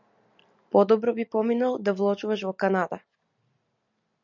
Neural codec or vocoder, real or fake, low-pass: none; real; 7.2 kHz